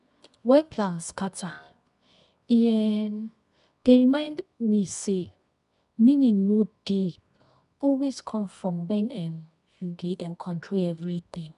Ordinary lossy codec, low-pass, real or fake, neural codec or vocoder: none; 10.8 kHz; fake; codec, 24 kHz, 0.9 kbps, WavTokenizer, medium music audio release